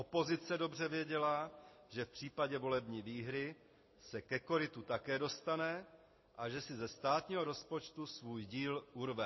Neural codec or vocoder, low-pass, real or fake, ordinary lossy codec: none; 7.2 kHz; real; MP3, 24 kbps